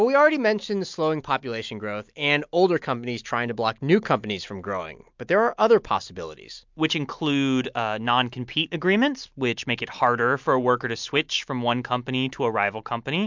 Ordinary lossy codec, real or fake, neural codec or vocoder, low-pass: MP3, 64 kbps; real; none; 7.2 kHz